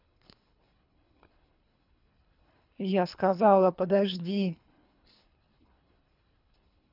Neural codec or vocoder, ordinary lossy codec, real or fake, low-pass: codec, 24 kHz, 3 kbps, HILCodec; none; fake; 5.4 kHz